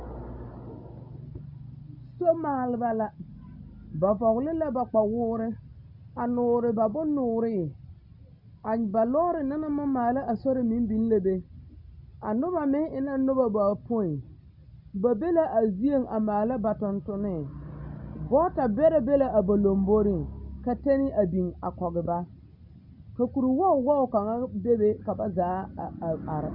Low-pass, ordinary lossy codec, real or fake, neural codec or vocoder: 5.4 kHz; Opus, 64 kbps; real; none